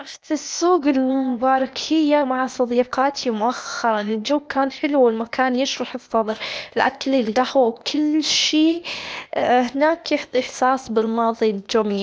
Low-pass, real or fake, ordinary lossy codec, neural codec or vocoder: none; fake; none; codec, 16 kHz, 0.8 kbps, ZipCodec